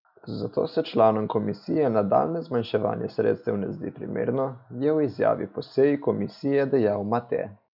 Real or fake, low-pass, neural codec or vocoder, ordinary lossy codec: real; 5.4 kHz; none; none